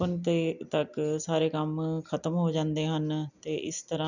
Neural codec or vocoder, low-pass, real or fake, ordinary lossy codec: none; 7.2 kHz; real; none